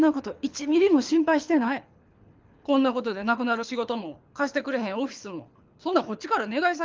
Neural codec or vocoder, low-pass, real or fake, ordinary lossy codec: codec, 24 kHz, 6 kbps, HILCodec; 7.2 kHz; fake; Opus, 24 kbps